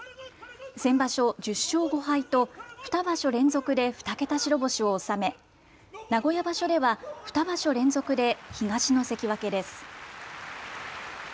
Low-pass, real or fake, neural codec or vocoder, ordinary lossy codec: none; real; none; none